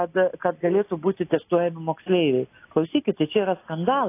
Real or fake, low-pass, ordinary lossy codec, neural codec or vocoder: real; 3.6 kHz; AAC, 24 kbps; none